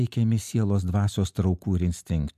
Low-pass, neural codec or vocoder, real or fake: 14.4 kHz; none; real